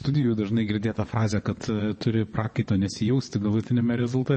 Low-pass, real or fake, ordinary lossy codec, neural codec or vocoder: 9.9 kHz; fake; MP3, 32 kbps; vocoder, 22.05 kHz, 80 mel bands, WaveNeXt